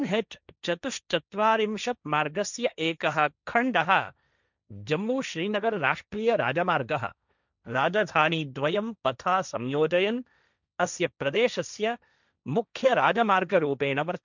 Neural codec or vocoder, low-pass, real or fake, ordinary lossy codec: codec, 16 kHz, 1.1 kbps, Voila-Tokenizer; 7.2 kHz; fake; none